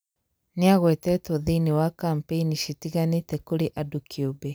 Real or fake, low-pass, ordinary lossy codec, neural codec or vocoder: real; none; none; none